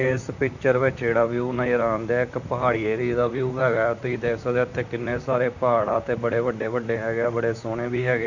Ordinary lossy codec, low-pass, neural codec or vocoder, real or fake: none; 7.2 kHz; vocoder, 44.1 kHz, 128 mel bands, Pupu-Vocoder; fake